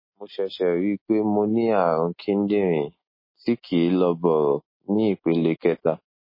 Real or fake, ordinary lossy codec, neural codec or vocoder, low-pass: real; MP3, 24 kbps; none; 5.4 kHz